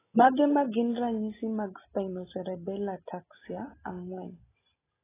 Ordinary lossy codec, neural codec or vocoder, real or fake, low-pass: AAC, 16 kbps; none; real; 3.6 kHz